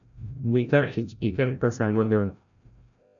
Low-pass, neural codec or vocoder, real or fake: 7.2 kHz; codec, 16 kHz, 0.5 kbps, FreqCodec, larger model; fake